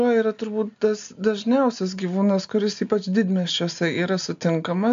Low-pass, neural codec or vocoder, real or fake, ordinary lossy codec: 7.2 kHz; none; real; AAC, 64 kbps